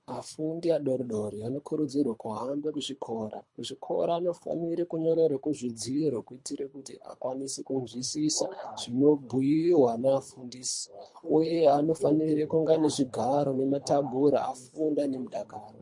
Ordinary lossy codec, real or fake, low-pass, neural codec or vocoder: MP3, 48 kbps; fake; 10.8 kHz; codec, 24 kHz, 3 kbps, HILCodec